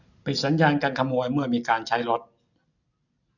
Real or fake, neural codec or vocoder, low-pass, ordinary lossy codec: real; none; 7.2 kHz; none